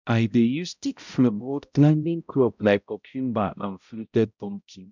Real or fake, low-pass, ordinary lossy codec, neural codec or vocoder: fake; 7.2 kHz; none; codec, 16 kHz, 0.5 kbps, X-Codec, HuBERT features, trained on balanced general audio